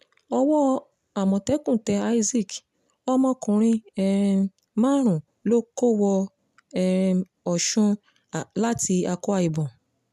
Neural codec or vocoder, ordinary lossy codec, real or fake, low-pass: none; none; real; 14.4 kHz